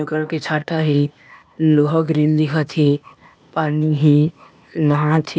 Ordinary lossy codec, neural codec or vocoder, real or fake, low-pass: none; codec, 16 kHz, 0.8 kbps, ZipCodec; fake; none